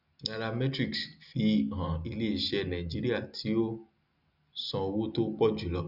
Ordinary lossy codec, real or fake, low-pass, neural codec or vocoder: none; real; 5.4 kHz; none